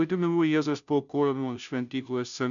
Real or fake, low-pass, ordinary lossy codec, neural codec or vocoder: fake; 7.2 kHz; MP3, 96 kbps; codec, 16 kHz, 0.5 kbps, FunCodec, trained on Chinese and English, 25 frames a second